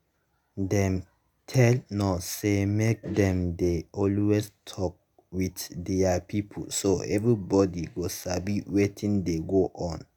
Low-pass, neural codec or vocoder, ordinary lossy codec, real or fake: none; none; none; real